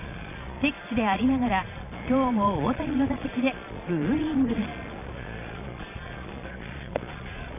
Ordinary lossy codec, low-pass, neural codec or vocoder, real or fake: none; 3.6 kHz; vocoder, 22.05 kHz, 80 mel bands, Vocos; fake